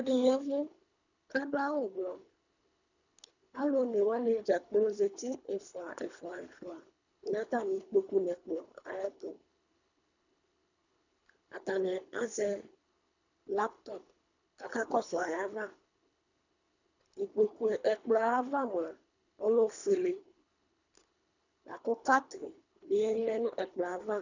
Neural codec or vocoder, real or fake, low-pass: codec, 24 kHz, 3 kbps, HILCodec; fake; 7.2 kHz